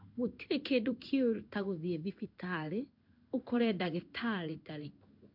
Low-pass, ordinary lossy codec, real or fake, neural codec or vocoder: 5.4 kHz; MP3, 48 kbps; fake; codec, 16 kHz in and 24 kHz out, 1 kbps, XY-Tokenizer